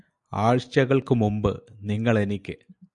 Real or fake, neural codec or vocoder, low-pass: real; none; 10.8 kHz